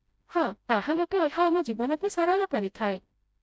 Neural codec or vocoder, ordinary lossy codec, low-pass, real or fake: codec, 16 kHz, 0.5 kbps, FreqCodec, smaller model; none; none; fake